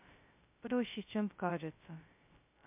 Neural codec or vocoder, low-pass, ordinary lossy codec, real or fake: codec, 16 kHz, 0.2 kbps, FocalCodec; 3.6 kHz; MP3, 32 kbps; fake